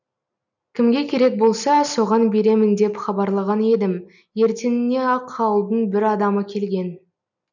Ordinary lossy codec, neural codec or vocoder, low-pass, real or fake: none; none; 7.2 kHz; real